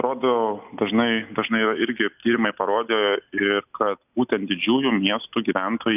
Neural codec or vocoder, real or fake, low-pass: none; real; 3.6 kHz